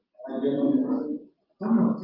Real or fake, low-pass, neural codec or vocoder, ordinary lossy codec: real; 5.4 kHz; none; Opus, 32 kbps